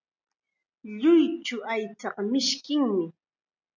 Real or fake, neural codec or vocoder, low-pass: real; none; 7.2 kHz